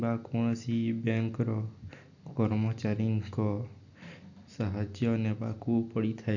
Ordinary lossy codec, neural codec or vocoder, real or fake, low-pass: none; none; real; 7.2 kHz